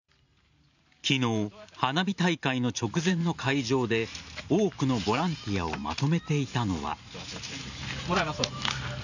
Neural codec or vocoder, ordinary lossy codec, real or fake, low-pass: vocoder, 44.1 kHz, 128 mel bands every 512 samples, BigVGAN v2; none; fake; 7.2 kHz